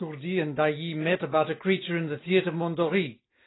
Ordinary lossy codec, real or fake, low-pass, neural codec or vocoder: AAC, 16 kbps; real; 7.2 kHz; none